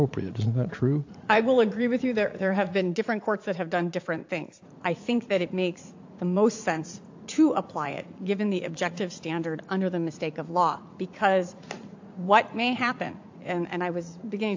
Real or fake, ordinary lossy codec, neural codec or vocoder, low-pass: fake; AAC, 48 kbps; vocoder, 44.1 kHz, 80 mel bands, Vocos; 7.2 kHz